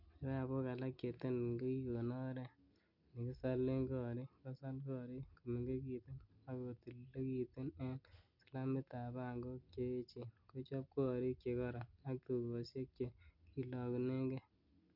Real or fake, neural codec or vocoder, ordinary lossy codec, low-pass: real; none; none; 5.4 kHz